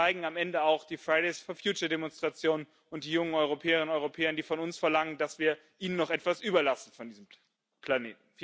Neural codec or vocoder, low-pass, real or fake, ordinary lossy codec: none; none; real; none